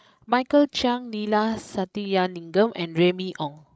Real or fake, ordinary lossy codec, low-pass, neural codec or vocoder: fake; none; none; codec, 16 kHz, 16 kbps, FreqCodec, larger model